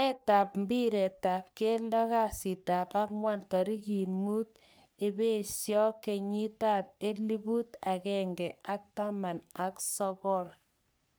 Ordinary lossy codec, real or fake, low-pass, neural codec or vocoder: none; fake; none; codec, 44.1 kHz, 3.4 kbps, Pupu-Codec